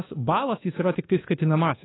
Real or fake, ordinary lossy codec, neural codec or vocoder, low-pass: fake; AAC, 16 kbps; codec, 24 kHz, 1.2 kbps, DualCodec; 7.2 kHz